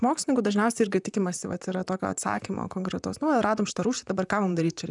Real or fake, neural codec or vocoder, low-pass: fake; vocoder, 44.1 kHz, 128 mel bands, Pupu-Vocoder; 10.8 kHz